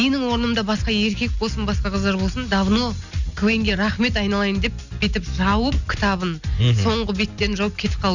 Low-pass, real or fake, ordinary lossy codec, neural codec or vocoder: 7.2 kHz; real; none; none